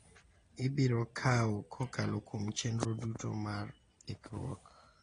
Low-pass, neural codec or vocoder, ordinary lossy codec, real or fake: 9.9 kHz; none; AAC, 32 kbps; real